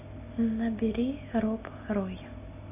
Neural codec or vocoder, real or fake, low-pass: none; real; 3.6 kHz